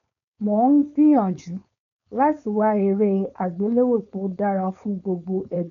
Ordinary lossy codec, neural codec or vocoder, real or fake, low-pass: none; codec, 16 kHz, 4.8 kbps, FACodec; fake; 7.2 kHz